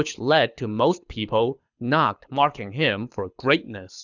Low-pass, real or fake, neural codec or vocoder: 7.2 kHz; fake; codec, 24 kHz, 6 kbps, HILCodec